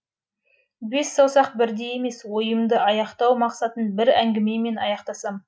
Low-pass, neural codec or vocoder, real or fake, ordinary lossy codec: none; none; real; none